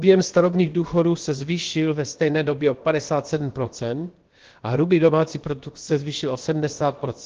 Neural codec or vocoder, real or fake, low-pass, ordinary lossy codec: codec, 16 kHz, about 1 kbps, DyCAST, with the encoder's durations; fake; 7.2 kHz; Opus, 16 kbps